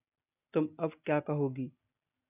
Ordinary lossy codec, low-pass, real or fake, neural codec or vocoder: AAC, 32 kbps; 3.6 kHz; real; none